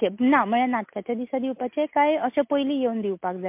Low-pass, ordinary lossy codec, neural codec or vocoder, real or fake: 3.6 kHz; MP3, 32 kbps; none; real